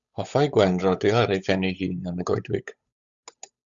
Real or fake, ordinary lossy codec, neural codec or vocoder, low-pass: fake; Opus, 64 kbps; codec, 16 kHz, 8 kbps, FunCodec, trained on Chinese and English, 25 frames a second; 7.2 kHz